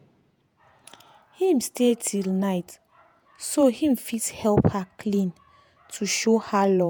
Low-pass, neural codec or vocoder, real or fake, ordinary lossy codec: none; vocoder, 48 kHz, 128 mel bands, Vocos; fake; none